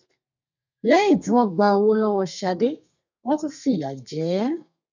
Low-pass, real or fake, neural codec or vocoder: 7.2 kHz; fake; codec, 32 kHz, 1.9 kbps, SNAC